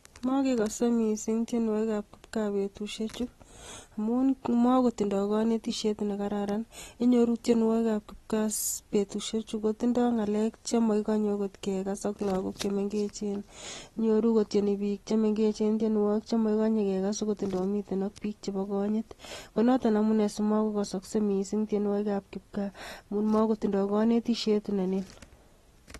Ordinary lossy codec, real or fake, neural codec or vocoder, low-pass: AAC, 32 kbps; real; none; 19.8 kHz